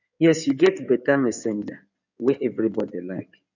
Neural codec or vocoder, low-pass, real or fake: codec, 16 kHz in and 24 kHz out, 2.2 kbps, FireRedTTS-2 codec; 7.2 kHz; fake